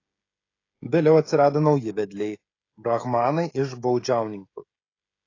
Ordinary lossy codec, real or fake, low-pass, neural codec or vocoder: AAC, 32 kbps; fake; 7.2 kHz; codec, 16 kHz, 16 kbps, FreqCodec, smaller model